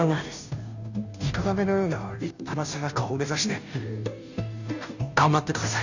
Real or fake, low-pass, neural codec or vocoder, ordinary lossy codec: fake; 7.2 kHz; codec, 16 kHz, 0.5 kbps, FunCodec, trained on Chinese and English, 25 frames a second; none